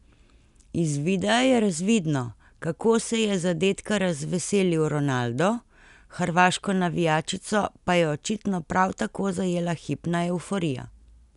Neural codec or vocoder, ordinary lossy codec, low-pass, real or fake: none; none; 10.8 kHz; real